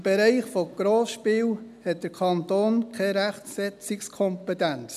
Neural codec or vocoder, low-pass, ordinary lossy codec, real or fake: none; 14.4 kHz; none; real